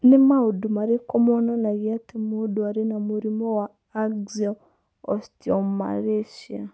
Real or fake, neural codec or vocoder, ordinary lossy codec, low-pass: real; none; none; none